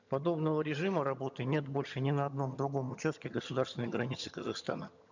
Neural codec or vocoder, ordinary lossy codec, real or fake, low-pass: vocoder, 22.05 kHz, 80 mel bands, HiFi-GAN; none; fake; 7.2 kHz